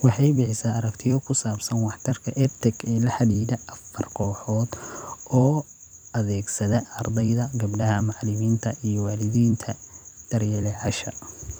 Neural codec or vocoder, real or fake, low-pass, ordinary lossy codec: vocoder, 44.1 kHz, 128 mel bands every 256 samples, BigVGAN v2; fake; none; none